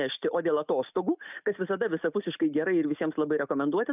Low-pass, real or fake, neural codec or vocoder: 3.6 kHz; real; none